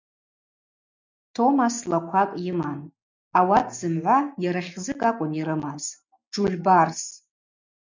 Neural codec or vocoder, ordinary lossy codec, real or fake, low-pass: none; MP3, 64 kbps; real; 7.2 kHz